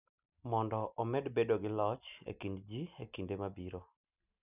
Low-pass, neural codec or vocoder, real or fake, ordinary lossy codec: 3.6 kHz; none; real; none